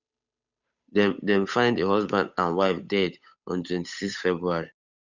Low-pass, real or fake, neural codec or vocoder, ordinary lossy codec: 7.2 kHz; fake; codec, 16 kHz, 8 kbps, FunCodec, trained on Chinese and English, 25 frames a second; none